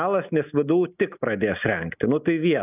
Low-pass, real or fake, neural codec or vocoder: 3.6 kHz; real; none